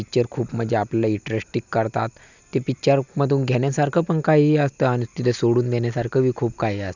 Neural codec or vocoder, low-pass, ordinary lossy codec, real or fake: none; 7.2 kHz; none; real